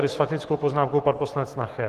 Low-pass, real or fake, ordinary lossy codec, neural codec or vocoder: 14.4 kHz; fake; Opus, 16 kbps; autoencoder, 48 kHz, 128 numbers a frame, DAC-VAE, trained on Japanese speech